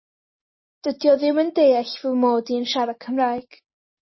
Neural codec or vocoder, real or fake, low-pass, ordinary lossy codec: none; real; 7.2 kHz; MP3, 24 kbps